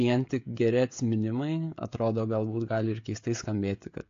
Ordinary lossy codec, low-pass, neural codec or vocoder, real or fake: AAC, 48 kbps; 7.2 kHz; codec, 16 kHz, 16 kbps, FreqCodec, smaller model; fake